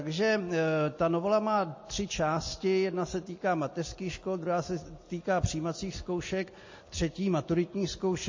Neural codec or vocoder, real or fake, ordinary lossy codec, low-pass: none; real; MP3, 32 kbps; 7.2 kHz